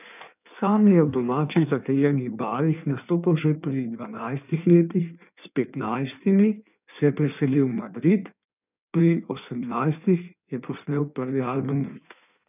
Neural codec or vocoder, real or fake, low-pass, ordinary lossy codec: codec, 16 kHz in and 24 kHz out, 1.1 kbps, FireRedTTS-2 codec; fake; 3.6 kHz; none